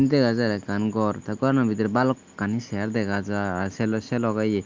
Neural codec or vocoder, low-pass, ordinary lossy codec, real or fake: none; 7.2 kHz; Opus, 32 kbps; real